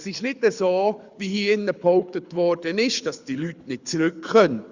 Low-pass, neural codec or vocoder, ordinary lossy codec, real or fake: 7.2 kHz; codec, 24 kHz, 6 kbps, HILCodec; Opus, 64 kbps; fake